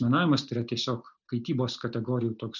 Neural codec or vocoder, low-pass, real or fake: none; 7.2 kHz; real